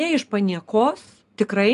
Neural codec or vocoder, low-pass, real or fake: none; 10.8 kHz; real